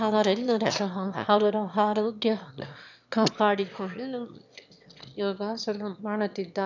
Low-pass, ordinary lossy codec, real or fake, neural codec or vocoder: 7.2 kHz; none; fake; autoencoder, 22.05 kHz, a latent of 192 numbers a frame, VITS, trained on one speaker